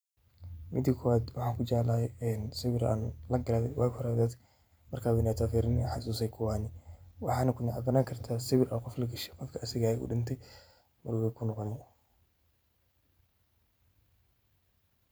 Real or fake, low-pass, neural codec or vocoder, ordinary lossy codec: fake; none; vocoder, 44.1 kHz, 128 mel bands every 512 samples, BigVGAN v2; none